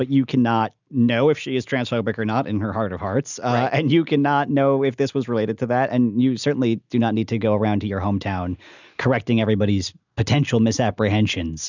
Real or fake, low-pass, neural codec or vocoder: real; 7.2 kHz; none